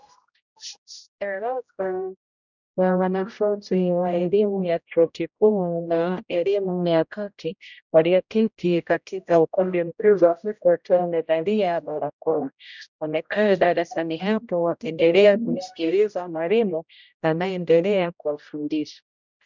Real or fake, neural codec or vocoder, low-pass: fake; codec, 16 kHz, 0.5 kbps, X-Codec, HuBERT features, trained on general audio; 7.2 kHz